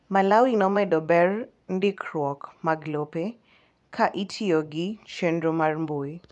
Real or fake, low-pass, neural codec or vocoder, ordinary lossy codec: fake; 10.8 kHz; vocoder, 24 kHz, 100 mel bands, Vocos; none